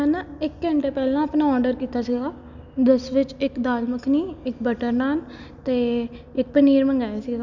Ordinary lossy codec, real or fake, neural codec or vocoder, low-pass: none; real; none; 7.2 kHz